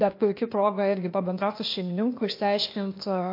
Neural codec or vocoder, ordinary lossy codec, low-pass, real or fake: codec, 16 kHz, 0.8 kbps, ZipCodec; MP3, 32 kbps; 5.4 kHz; fake